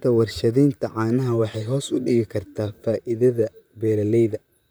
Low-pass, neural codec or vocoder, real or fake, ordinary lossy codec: none; vocoder, 44.1 kHz, 128 mel bands, Pupu-Vocoder; fake; none